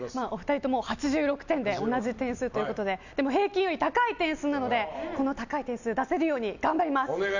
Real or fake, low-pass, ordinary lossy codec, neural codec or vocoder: real; 7.2 kHz; none; none